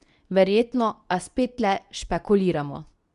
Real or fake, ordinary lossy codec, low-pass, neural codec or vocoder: fake; none; 10.8 kHz; codec, 24 kHz, 0.9 kbps, WavTokenizer, medium speech release version 2